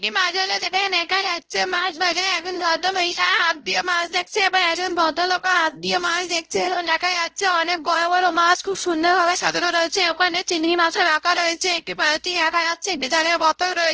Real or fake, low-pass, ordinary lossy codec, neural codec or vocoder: fake; 7.2 kHz; Opus, 16 kbps; codec, 16 kHz, 0.5 kbps, X-Codec, WavLM features, trained on Multilingual LibriSpeech